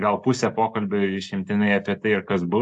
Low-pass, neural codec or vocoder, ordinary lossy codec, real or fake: 10.8 kHz; none; AAC, 64 kbps; real